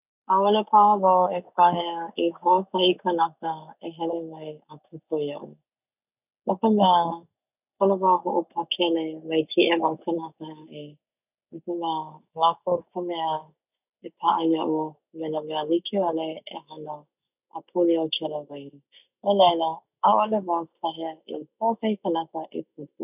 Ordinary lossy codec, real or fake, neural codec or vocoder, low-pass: none; real; none; 3.6 kHz